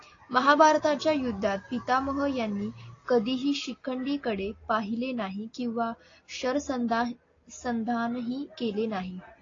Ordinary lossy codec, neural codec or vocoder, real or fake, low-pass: AAC, 32 kbps; none; real; 7.2 kHz